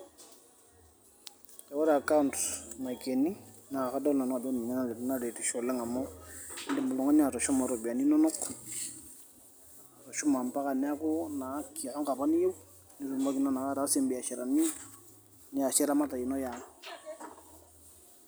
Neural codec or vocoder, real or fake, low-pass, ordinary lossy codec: none; real; none; none